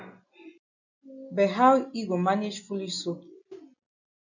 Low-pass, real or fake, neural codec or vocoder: 7.2 kHz; real; none